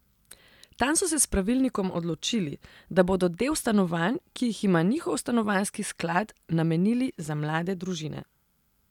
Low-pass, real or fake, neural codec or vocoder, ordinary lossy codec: 19.8 kHz; real; none; none